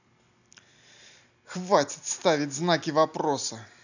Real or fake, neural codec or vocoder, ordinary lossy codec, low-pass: real; none; none; 7.2 kHz